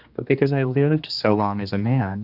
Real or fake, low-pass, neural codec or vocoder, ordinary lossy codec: fake; 5.4 kHz; codec, 16 kHz, 2 kbps, X-Codec, HuBERT features, trained on general audio; Opus, 64 kbps